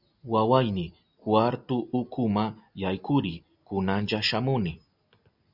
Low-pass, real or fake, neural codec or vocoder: 5.4 kHz; real; none